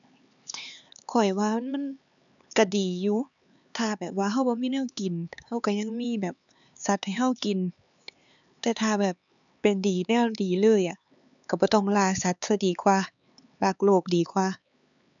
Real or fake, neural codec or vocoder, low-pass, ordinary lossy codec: fake; codec, 16 kHz, 4 kbps, X-Codec, HuBERT features, trained on LibriSpeech; 7.2 kHz; none